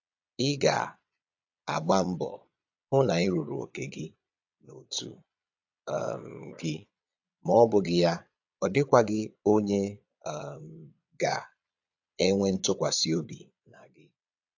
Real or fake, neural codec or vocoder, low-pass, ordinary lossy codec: fake; vocoder, 22.05 kHz, 80 mel bands, Vocos; 7.2 kHz; AAC, 48 kbps